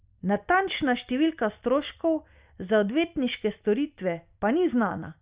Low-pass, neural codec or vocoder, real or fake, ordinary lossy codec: 3.6 kHz; none; real; Opus, 64 kbps